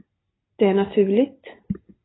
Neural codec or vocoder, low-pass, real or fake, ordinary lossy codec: none; 7.2 kHz; real; AAC, 16 kbps